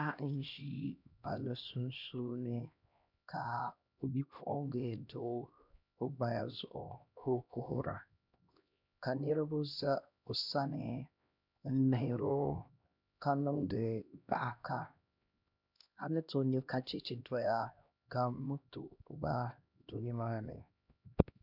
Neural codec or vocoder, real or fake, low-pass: codec, 16 kHz, 1 kbps, X-Codec, HuBERT features, trained on LibriSpeech; fake; 5.4 kHz